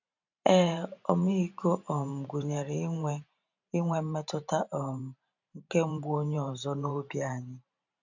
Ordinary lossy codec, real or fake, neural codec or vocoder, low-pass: none; fake; vocoder, 44.1 kHz, 128 mel bands every 512 samples, BigVGAN v2; 7.2 kHz